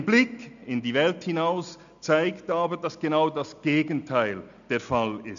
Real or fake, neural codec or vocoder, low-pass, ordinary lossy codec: real; none; 7.2 kHz; none